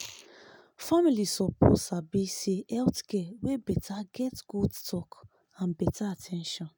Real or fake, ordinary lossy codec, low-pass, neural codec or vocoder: real; none; none; none